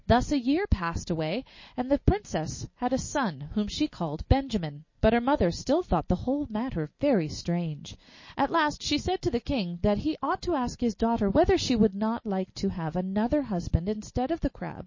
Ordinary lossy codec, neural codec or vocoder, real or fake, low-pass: MP3, 32 kbps; none; real; 7.2 kHz